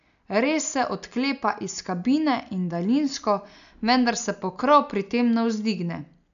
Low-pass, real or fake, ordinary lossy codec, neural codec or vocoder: 7.2 kHz; real; none; none